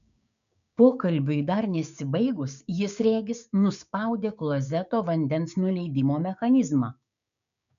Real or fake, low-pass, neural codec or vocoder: fake; 7.2 kHz; codec, 16 kHz, 6 kbps, DAC